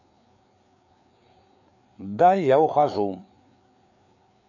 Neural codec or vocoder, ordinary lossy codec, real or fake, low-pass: codec, 16 kHz, 4 kbps, FreqCodec, larger model; none; fake; 7.2 kHz